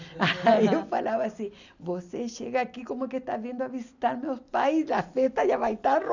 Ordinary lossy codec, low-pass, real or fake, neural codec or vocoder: none; 7.2 kHz; real; none